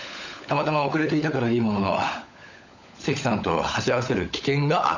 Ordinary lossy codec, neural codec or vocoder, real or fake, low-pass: none; codec, 16 kHz, 16 kbps, FunCodec, trained on LibriTTS, 50 frames a second; fake; 7.2 kHz